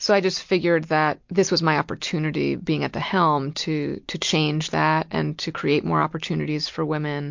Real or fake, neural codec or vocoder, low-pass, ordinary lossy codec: real; none; 7.2 kHz; MP3, 48 kbps